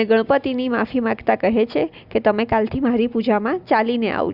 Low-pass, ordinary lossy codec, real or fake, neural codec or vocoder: 5.4 kHz; Opus, 64 kbps; real; none